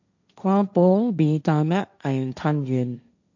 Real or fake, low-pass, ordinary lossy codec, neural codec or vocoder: fake; 7.2 kHz; none; codec, 16 kHz, 1.1 kbps, Voila-Tokenizer